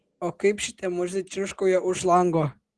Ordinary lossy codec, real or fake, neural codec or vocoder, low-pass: Opus, 16 kbps; real; none; 10.8 kHz